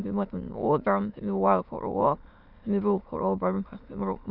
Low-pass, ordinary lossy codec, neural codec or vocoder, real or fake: 5.4 kHz; none; autoencoder, 22.05 kHz, a latent of 192 numbers a frame, VITS, trained on many speakers; fake